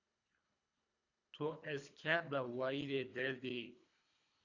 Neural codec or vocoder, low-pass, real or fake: codec, 24 kHz, 3 kbps, HILCodec; 7.2 kHz; fake